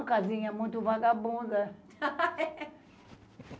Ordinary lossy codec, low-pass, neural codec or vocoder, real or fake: none; none; none; real